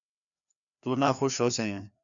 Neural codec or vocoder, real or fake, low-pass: codec, 16 kHz, 2 kbps, FreqCodec, larger model; fake; 7.2 kHz